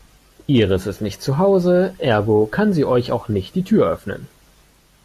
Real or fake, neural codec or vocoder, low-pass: real; none; 14.4 kHz